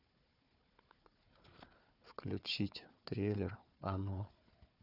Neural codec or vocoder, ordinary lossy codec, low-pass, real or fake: codec, 16 kHz, 4 kbps, FunCodec, trained on Chinese and English, 50 frames a second; none; 5.4 kHz; fake